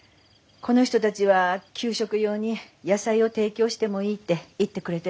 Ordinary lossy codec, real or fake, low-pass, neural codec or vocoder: none; real; none; none